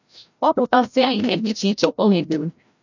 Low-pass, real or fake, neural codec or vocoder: 7.2 kHz; fake; codec, 16 kHz, 0.5 kbps, FreqCodec, larger model